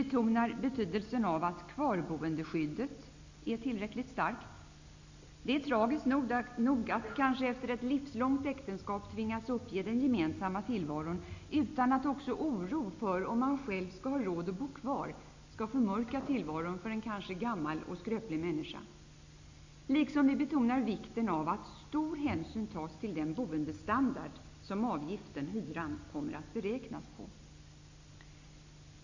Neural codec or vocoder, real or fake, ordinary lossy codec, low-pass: none; real; AAC, 48 kbps; 7.2 kHz